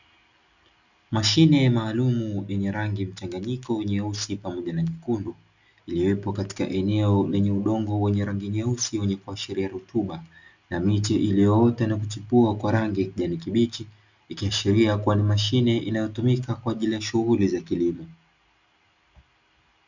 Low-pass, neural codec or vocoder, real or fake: 7.2 kHz; none; real